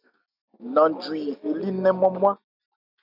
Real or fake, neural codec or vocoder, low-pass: real; none; 5.4 kHz